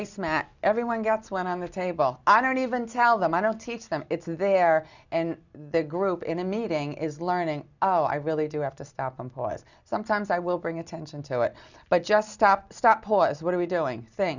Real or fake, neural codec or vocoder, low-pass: real; none; 7.2 kHz